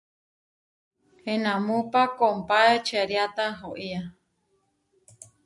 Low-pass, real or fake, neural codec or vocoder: 10.8 kHz; real; none